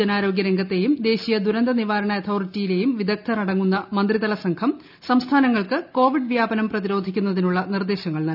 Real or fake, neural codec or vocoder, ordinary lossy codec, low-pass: real; none; none; 5.4 kHz